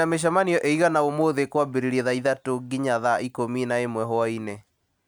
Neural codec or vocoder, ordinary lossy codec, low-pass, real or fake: none; none; none; real